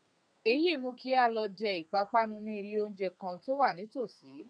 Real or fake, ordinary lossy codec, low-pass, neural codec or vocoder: fake; none; 9.9 kHz; codec, 32 kHz, 1.9 kbps, SNAC